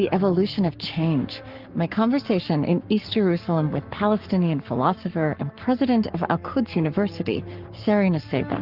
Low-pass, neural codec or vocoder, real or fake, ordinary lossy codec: 5.4 kHz; codec, 44.1 kHz, 7.8 kbps, Pupu-Codec; fake; Opus, 24 kbps